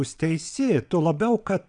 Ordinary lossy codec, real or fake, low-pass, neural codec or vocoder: MP3, 96 kbps; fake; 9.9 kHz; vocoder, 22.05 kHz, 80 mel bands, WaveNeXt